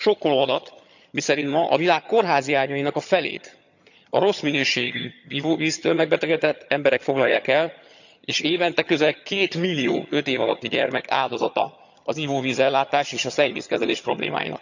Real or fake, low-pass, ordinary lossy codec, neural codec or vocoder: fake; 7.2 kHz; none; vocoder, 22.05 kHz, 80 mel bands, HiFi-GAN